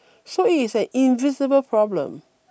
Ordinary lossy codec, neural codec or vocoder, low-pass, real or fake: none; none; none; real